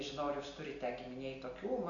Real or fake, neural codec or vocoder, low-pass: real; none; 7.2 kHz